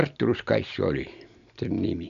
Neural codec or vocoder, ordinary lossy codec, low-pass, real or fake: none; none; 7.2 kHz; real